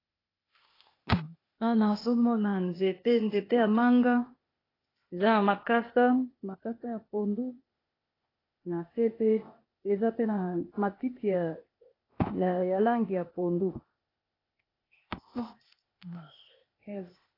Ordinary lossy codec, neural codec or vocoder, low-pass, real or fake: AAC, 24 kbps; codec, 16 kHz, 0.8 kbps, ZipCodec; 5.4 kHz; fake